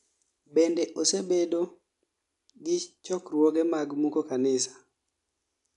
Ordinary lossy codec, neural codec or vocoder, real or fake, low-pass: none; none; real; 10.8 kHz